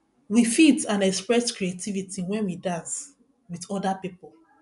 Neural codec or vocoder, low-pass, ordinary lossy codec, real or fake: none; 10.8 kHz; none; real